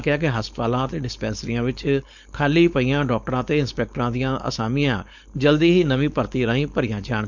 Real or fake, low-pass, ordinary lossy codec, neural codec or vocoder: fake; 7.2 kHz; none; codec, 16 kHz, 4.8 kbps, FACodec